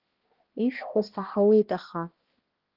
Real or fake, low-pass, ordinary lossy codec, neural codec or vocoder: fake; 5.4 kHz; Opus, 16 kbps; codec, 16 kHz, 1 kbps, X-Codec, HuBERT features, trained on balanced general audio